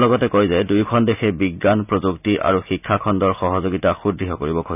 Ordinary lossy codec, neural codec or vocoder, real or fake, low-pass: none; none; real; 3.6 kHz